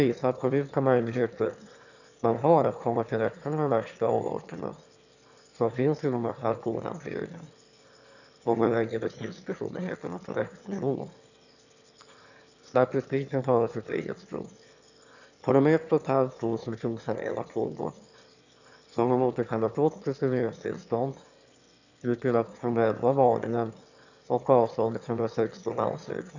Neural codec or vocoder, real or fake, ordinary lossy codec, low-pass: autoencoder, 22.05 kHz, a latent of 192 numbers a frame, VITS, trained on one speaker; fake; none; 7.2 kHz